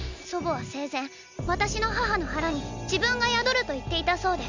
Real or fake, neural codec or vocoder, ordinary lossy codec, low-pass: real; none; none; 7.2 kHz